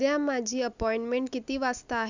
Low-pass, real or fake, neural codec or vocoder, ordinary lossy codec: 7.2 kHz; real; none; none